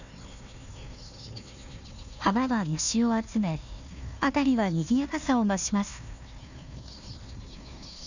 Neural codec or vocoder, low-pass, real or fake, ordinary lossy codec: codec, 16 kHz, 1 kbps, FunCodec, trained on Chinese and English, 50 frames a second; 7.2 kHz; fake; none